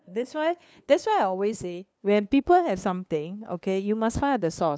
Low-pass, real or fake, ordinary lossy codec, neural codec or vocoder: none; fake; none; codec, 16 kHz, 2 kbps, FunCodec, trained on LibriTTS, 25 frames a second